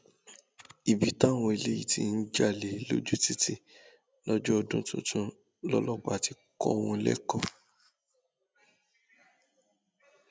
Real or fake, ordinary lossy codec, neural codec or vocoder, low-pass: real; none; none; none